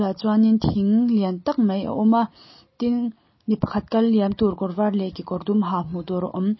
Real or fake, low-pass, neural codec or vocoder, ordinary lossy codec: real; 7.2 kHz; none; MP3, 24 kbps